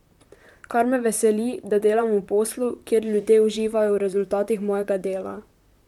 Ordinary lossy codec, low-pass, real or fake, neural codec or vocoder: MP3, 96 kbps; 19.8 kHz; fake; vocoder, 44.1 kHz, 128 mel bands, Pupu-Vocoder